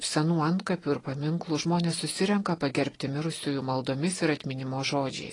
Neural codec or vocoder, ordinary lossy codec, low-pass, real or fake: none; AAC, 32 kbps; 10.8 kHz; real